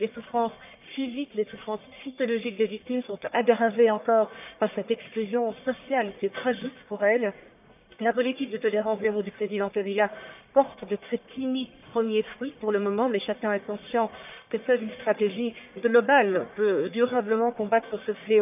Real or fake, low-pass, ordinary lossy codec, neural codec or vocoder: fake; 3.6 kHz; none; codec, 44.1 kHz, 1.7 kbps, Pupu-Codec